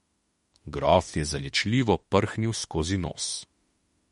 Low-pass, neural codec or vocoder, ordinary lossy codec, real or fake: 19.8 kHz; autoencoder, 48 kHz, 32 numbers a frame, DAC-VAE, trained on Japanese speech; MP3, 48 kbps; fake